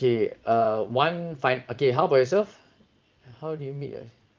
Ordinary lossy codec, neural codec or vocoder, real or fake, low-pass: Opus, 32 kbps; vocoder, 22.05 kHz, 80 mel bands, Vocos; fake; 7.2 kHz